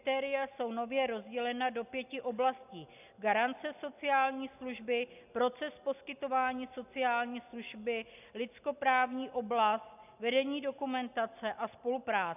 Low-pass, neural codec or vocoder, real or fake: 3.6 kHz; none; real